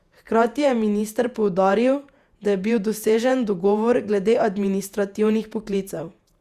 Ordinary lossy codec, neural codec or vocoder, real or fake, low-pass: Opus, 64 kbps; vocoder, 48 kHz, 128 mel bands, Vocos; fake; 14.4 kHz